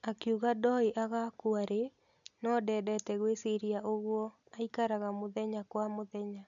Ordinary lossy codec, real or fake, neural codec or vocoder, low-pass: none; real; none; 7.2 kHz